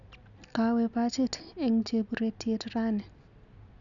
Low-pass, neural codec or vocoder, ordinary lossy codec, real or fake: 7.2 kHz; none; none; real